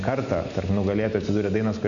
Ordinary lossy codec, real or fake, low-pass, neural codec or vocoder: AAC, 64 kbps; real; 7.2 kHz; none